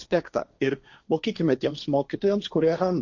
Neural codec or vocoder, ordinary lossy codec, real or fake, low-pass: codec, 16 kHz, 1.1 kbps, Voila-Tokenizer; Opus, 64 kbps; fake; 7.2 kHz